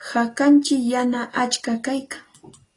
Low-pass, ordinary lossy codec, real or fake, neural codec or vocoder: 10.8 kHz; AAC, 32 kbps; real; none